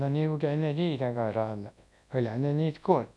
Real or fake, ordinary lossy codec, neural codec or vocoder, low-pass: fake; none; codec, 24 kHz, 0.9 kbps, WavTokenizer, large speech release; none